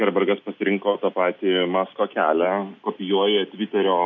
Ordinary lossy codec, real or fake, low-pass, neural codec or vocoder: MP3, 48 kbps; real; 7.2 kHz; none